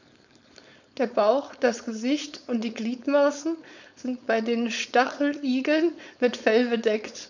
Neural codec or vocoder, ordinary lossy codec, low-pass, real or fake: codec, 16 kHz, 4.8 kbps, FACodec; none; 7.2 kHz; fake